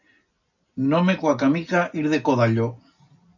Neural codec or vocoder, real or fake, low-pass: none; real; 7.2 kHz